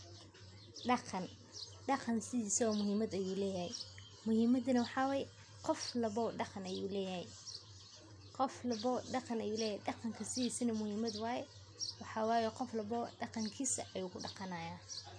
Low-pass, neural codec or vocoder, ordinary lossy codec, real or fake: 9.9 kHz; none; MP3, 64 kbps; real